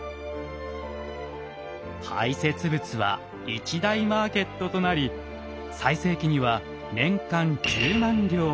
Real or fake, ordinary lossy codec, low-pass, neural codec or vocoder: real; none; none; none